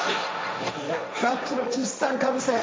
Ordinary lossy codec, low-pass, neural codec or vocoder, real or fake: none; none; codec, 16 kHz, 1.1 kbps, Voila-Tokenizer; fake